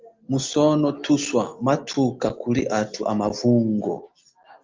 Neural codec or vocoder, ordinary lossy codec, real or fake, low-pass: none; Opus, 32 kbps; real; 7.2 kHz